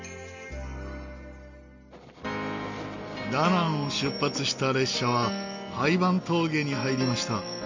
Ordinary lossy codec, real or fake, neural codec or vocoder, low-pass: MP3, 64 kbps; real; none; 7.2 kHz